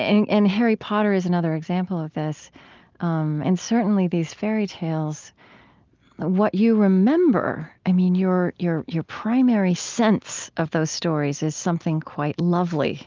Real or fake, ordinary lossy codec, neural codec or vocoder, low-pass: real; Opus, 24 kbps; none; 7.2 kHz